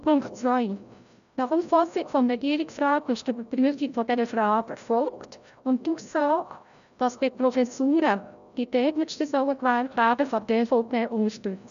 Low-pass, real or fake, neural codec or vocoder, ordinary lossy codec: 7.2 kHz; fake; codec, 16 kHz, 0.5 kbps, FreqCodec, larger model; none